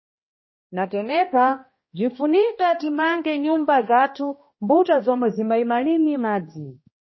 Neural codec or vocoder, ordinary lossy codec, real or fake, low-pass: codec, 16 kHz, 1 kbps, X-Codec, HuBERT features, trained on balanced general audio; MP3, 24 kbps; fake; 7.2 kHz